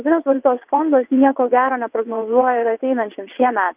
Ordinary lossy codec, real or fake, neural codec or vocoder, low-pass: Opus, 24 kbps; fake; vocoder, 22.05 kHz, 80 mel bands, WaveNeXt; 3.6 kHz